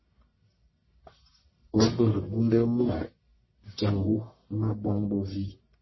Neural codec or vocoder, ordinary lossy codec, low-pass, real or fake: codec, 44.1 kHz, 1.7 kbps, Pupu-Codec; MP3, 24 kbps; 7.2 kHz; fake